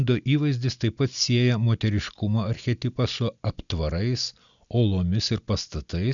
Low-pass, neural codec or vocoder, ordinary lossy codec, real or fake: 7.2 kHz; none; MP3, 96 kbps; real